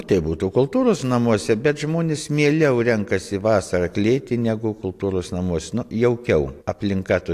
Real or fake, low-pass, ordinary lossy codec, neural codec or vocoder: real; 14.4 kHz; AAC, 64 kbps; none